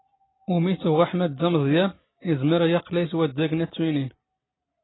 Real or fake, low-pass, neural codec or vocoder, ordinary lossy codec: real; 7.2 kHz; none; AAC, 16 kbps